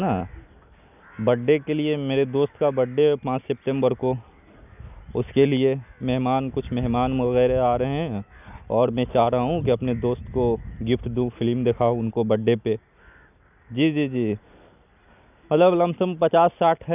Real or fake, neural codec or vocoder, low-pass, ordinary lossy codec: real; none; 3.6 kHz; none